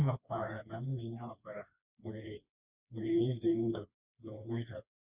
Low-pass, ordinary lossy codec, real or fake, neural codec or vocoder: 3.6 kHz; Opus, 64 kbps; fake; codec, 16 kHz, 2 kbps, FreqCodec, smaller model